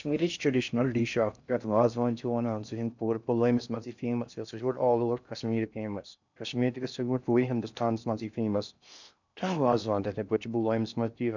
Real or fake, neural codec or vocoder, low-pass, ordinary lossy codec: fake; codec, 16 kHz in and 24 kHz out, 0.6 kbps, FocalCodec, streaming, 2048 codes; 7.2 kHz; none